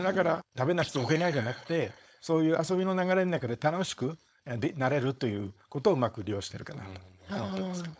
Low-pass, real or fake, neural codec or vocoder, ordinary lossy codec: none; fake; codec, 16 kHz, 4.8 kbps, FACodec; none